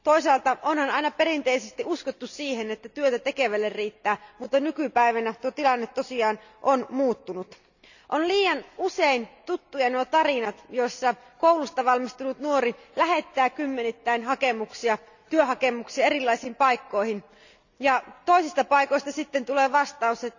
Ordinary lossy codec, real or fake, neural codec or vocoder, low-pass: none; real; none; 7.2 kHz